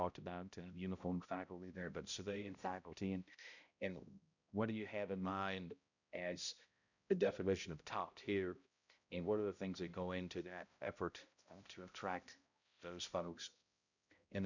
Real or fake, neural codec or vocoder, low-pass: fake; codec, 16 kHz, 0.5 kbps, X-Codec, HuBERT features, trained on balanced general audio; 7.2 kHz